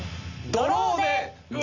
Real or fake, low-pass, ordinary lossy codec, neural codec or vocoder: real; 7.2 kHz; none; none